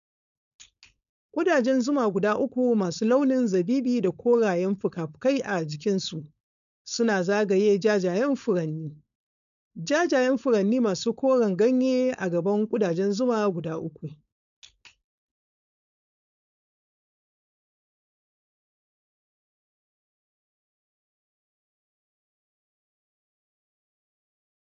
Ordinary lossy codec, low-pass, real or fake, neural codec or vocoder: none; 7.2 kHz; fake; codec, 16 kHz, 4.8 kbps, FACodec